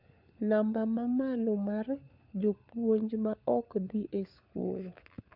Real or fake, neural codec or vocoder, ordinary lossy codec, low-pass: fake; codec, 16 kHz, 4 kbps, FunCodec, trained on LibriTTS, 50 frames a second; none; 5.4 kHz